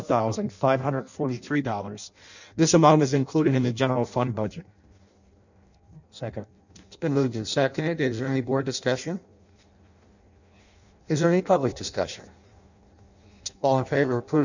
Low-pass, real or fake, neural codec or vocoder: 7.2 kHz; fake; codec, 16 kHz in and 24 kHz out, 0.6 kbps, FireRedTTS-2 codec